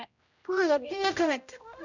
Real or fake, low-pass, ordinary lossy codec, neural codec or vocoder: fake; 7.2 kHz; none; codec, 16 kHz, 0.5 kbps, X-Codec, HuBERT features, trained on general audio